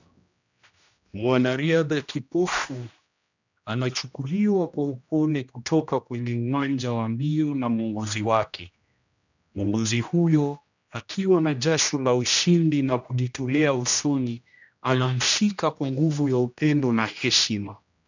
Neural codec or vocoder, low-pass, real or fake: codec, 16 kHz, 1 kbps, X-Codec, HuBERT features, trained on general audio; 7.2 kHz; fake